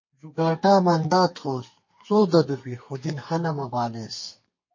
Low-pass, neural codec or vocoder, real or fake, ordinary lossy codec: 7.2 kHz; codec, 32 kHz, 1.9 kbps, SNAC; fake; MP3, 32 kbps